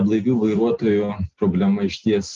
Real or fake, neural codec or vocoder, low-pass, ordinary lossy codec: real; none; 7.2 kHz; Opus, 32 kbps